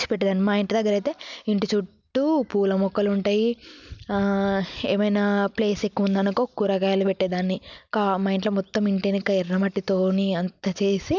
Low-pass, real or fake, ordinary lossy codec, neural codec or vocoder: 7.2 kHz; real; none; none